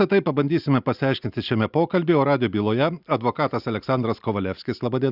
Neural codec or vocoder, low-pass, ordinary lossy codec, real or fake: none; 5.4 kHz; Opus, 64 kbps; real